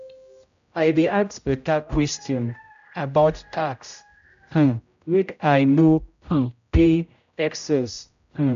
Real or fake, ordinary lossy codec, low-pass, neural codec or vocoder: fake; MP3, 64 kbps; 7.2 kHz; codec, 16 kHz, 0.5 kbps, X-Codec, HuBERT features, trained on general audio